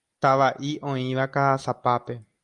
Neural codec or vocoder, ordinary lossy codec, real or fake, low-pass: none; Opus, 32 kbps; real; 10.8 kHz